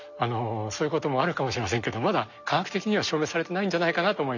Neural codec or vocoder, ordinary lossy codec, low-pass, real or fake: none; none; 7.2 kHz; real